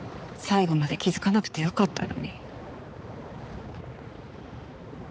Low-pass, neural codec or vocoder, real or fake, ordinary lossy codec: none; codec, 16 kHz, 4 kbps, X-Codec, HuBERT features, trained on balanced general audio; fake; none